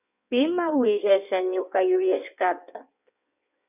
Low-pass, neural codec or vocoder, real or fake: 3.6 kHz; codec, 16 kHz in and 24 kHz out, 1.1 kbps, FireRedTTS-2 codec; fake